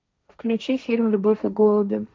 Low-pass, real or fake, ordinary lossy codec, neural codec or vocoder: none; fake; none; codec, 16 kHz, 1.1 kbps, Voila-Tokenizer